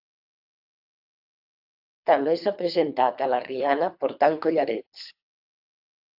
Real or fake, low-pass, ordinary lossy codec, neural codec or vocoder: fake; 5.4 kHz; AAC, 48 kbps; codec, 24 kHz, 3 kbps, HILCodec